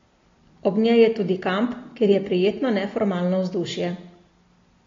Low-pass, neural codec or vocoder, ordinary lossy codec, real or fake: 7.2 kHz; none; AAC, 32 kbps; real